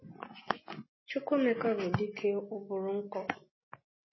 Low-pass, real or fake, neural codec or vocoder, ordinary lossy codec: 7.2 kHz; real; none; MP3, 24 kbps